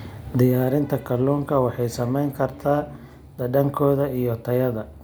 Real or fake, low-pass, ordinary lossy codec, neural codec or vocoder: real; none; none; none